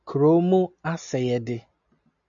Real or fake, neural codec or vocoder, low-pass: real; none; 7.2 kHz